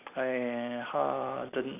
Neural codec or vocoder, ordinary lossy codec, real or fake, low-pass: none; none; real; 3.6 kHz